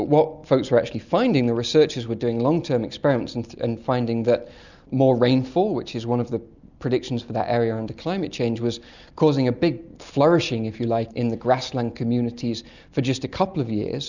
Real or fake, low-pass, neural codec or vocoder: real; 7.2 kHz; none